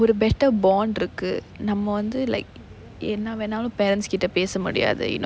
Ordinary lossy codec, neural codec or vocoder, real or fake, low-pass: none; none; real; none